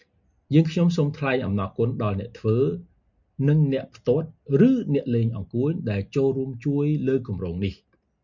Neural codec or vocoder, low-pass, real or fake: none; 7.2 kHz; real